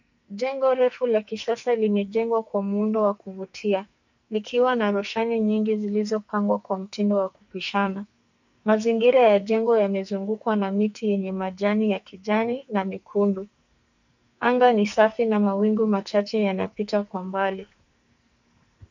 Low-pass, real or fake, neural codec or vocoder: 7.2 kHz; fake; codec, 44.1 kHz, 2.6 kbps, SNAC